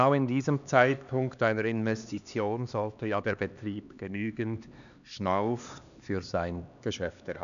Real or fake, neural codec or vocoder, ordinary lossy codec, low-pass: fake; codec, 16 kHz, 2 kbps, X-Codec, HuBERT features, trained on LibriSpeech; none; 7.2 kHz